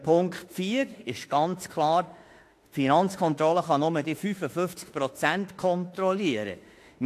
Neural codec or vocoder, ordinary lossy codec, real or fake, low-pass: autoencoder, 48 kHz, 32 numbers a frame, DAC-VAE, trained on Japanese speech; AAC, 64 kbps; fake; 14.4 kHz